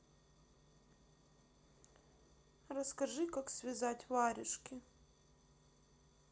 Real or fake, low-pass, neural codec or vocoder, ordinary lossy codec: real; none; none; none